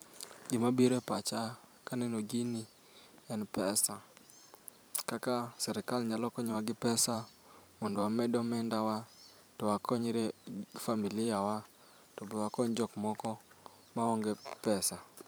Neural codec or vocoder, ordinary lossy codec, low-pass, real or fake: vocoder, 44.1 kHz, 128 mel bands every 512 samples, BigVGAN v2; none; none; fake